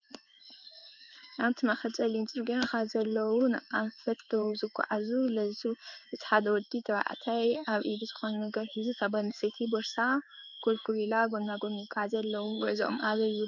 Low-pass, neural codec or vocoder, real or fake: 7.2 kHz; codec, 16 kHz in and 24 kHz out, 1 kbps, XY-Tokenizer; fake